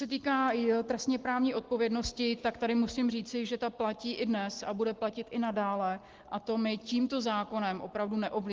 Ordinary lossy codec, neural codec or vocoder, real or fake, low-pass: Opus, 16 kbps; none; real; 7.2 kHz